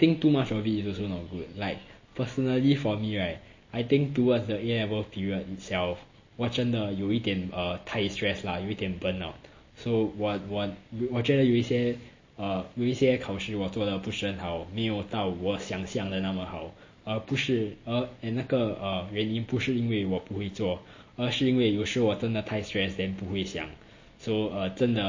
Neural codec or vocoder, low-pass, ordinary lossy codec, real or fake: none; 7.2 kHz; MP3, 32 kbps; real